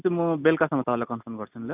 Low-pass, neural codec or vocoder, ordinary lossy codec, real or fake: 3.6 kHz; none; none; real